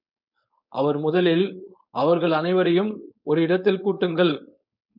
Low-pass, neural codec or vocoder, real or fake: 5.4 kHz; codec, 16 kHz, 4.8 kbps, FACodec; fake